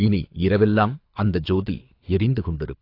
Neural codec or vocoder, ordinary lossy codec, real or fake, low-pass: codec, 24 kHz, 6 kbps, HILCodec; AAC, 32 kbps; fake; 5.4 kHz